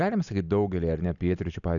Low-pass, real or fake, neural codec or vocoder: 7.2 kHz; real; none